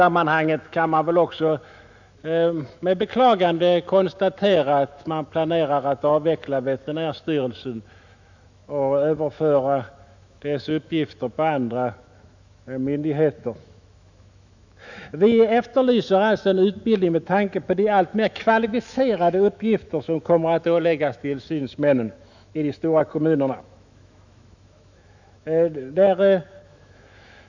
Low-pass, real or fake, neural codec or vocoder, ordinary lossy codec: 7.2 kHz; fake; autoencoder, 48 kHz, 128 numbers a frame, DAC-VAE, trained on Japanese speech; none